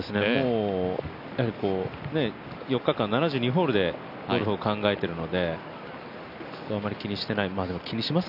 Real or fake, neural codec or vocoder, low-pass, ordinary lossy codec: real; none; 5.4 kHz; none